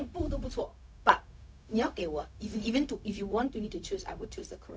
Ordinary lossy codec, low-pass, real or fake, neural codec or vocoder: none; none; fake; codec, 16 kHz, 0.4 kbps, LongCat-Audio-Codec